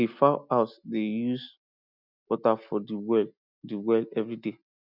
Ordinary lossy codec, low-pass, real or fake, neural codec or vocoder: none; 5.4 kHz; real; none